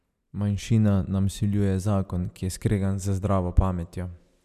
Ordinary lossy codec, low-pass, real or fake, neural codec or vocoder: none; 14.4 kHz; real; none